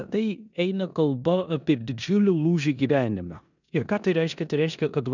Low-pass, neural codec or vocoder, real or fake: 7.2 kHz; codec, 16 kHz in and 24 kHz out, 0.9 kbps, LongCat-Audio-Codec, four codebook decoder; fake